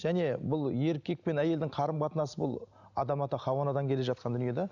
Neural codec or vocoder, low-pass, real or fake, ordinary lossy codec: none; 7.2 kHz; real; none